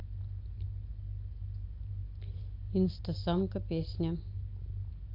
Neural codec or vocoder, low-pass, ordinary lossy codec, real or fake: none; 5.4 kHz; AAC, 32 kbps; real